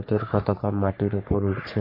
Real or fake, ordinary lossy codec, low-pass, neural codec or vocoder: fake; MP3, 32 kbps; 5.4 kHz; codec, 44.1 kHz, 3.4 kbps, Pupu-Codec